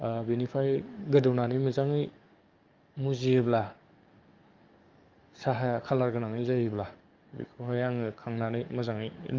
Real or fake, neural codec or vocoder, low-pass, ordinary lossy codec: fake; codec, 44.1 kHz, 7.8 kbps, DAC; 7.2 kHz; Opus, 24 kbps